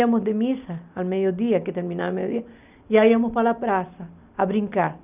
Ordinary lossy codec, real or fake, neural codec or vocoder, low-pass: none; real; none; 3.6 kHz